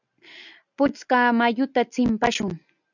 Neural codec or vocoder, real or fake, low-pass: none; real; 7.2 kHz